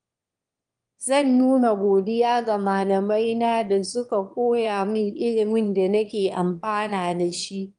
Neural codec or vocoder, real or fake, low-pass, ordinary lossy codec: autoencoder, 22.05 kHz, a latent of 192 numbers a frame, VITS, trained on one speaker; fake; 9.9 kHz; Opus, 32 kbps